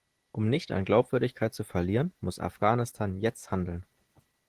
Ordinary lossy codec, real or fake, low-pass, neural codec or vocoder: Opus, 16 kbps; real; 14.4 kHz; none